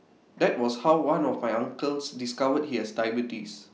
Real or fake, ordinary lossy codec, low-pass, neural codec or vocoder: real; none; none; none